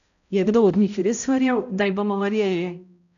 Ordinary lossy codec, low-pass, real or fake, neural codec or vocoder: none; 7.2 kHz; fake; codec, 16 kHz, 0.5 kbps, X-Codec, HuBERT features, trained on balanced general audio